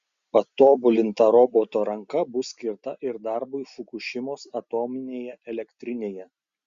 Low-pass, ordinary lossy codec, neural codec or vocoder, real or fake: 7.2 kHz; Opus, 64 kbps; none; real